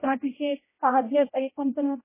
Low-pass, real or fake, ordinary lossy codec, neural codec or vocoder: 3.6 kHz; fake; MP3, 16 kbps; codec, 16 kHz, 0.5 kbps, X-Codec, HuBERT features, trained on general audio